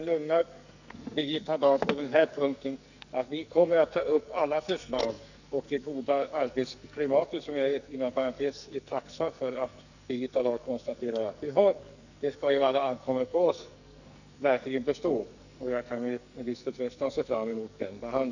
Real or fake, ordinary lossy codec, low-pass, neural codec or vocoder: fake; none; 7.2 kHz; codec, 44.1 kHz, 2.6 kbps, SNAC